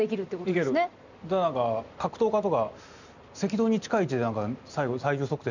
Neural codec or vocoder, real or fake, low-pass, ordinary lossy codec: none; real; 7.2 kHz; none